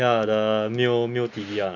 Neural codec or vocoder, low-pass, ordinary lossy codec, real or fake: none; 7.2 kHz; none; real